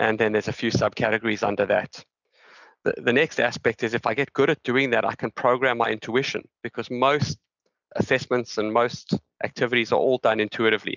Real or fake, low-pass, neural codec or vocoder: real; 7.2 kHz; none